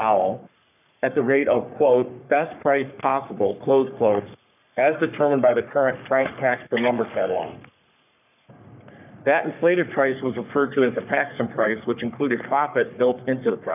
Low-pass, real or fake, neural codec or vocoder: 3.6 kHz; fake; codec, 44.1 kHz, 3.4 kbps, Pupu-Codec